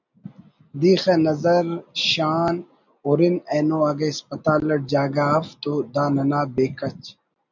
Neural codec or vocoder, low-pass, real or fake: none; 7.2 kHz; real